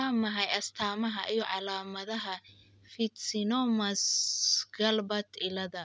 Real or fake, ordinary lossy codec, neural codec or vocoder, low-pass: real; none; none; none